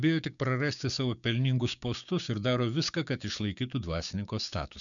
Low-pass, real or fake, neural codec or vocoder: 7.2 kHz; fake; codec, 16 kHz, 6 kbps, DAC